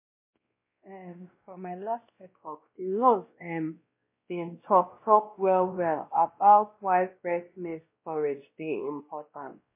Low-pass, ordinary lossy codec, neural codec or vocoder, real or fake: 3.6 kHz; MP3, 24 kbps; codec, 16 kHz, 1 kbps, X-Codec, WavLM features, trained on Multilingual LibriSpeech; fake